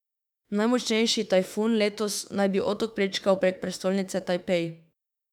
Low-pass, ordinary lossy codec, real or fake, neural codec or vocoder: 19.8 kHz; none; fake; autoencoder, 48 kHz, 32 numbers a frame, DAC-VAE, trained on Japanese speech